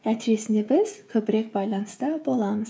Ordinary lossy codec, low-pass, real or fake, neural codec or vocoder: none; none; fake; codec, 16 kHz, 16 kbps, FreqCodec, smaller model